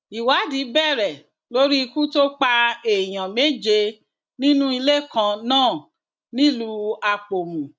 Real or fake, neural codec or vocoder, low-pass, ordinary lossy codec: real; none; none; none